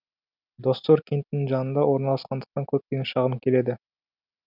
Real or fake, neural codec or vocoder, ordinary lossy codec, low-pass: real; none; none; 5.4 kHz